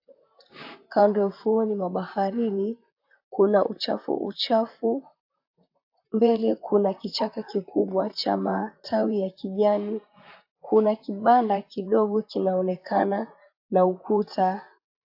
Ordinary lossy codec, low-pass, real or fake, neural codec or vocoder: AAC, 48 kbps; 5.4 kHz; fake; vocoder, 44.1 kHz, 128 mel bands, Pupu-Vocoder